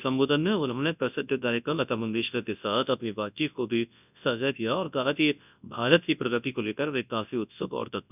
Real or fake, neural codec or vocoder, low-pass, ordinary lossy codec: fake; codec, 24 kHz, 0.9 kbps, WavTokenizer, large speech release; 3.6 kHz; none